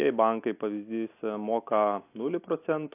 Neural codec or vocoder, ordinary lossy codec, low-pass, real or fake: none; AAC, 24 kbps; 3.6 kHz; real